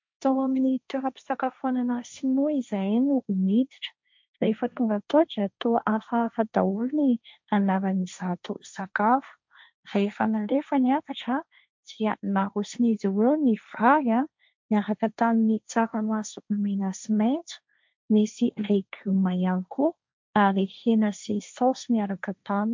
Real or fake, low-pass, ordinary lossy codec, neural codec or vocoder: fake; 7.2 kHz; MP3, 64 kbps; codec, 16 kHz, 1.1 kbps, Voila-Tokenizer